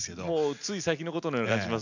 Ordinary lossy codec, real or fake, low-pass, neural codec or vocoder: none; real; 7.2 kHz; none